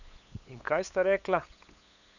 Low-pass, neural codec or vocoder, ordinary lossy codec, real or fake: 7.2 kHz; codec, 16 kHz, 8 kbps, FunCodec, trained on LibriTTS, 25 frames a second; none; fake